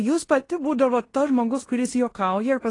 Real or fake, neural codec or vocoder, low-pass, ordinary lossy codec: fake; codec, 16 kHz in and 24 kHz out, 0.9 kbps, LongCat-Audio-Codec, fine tuned four codebook decoder; 10.8 kHz; AAC, 32 kbps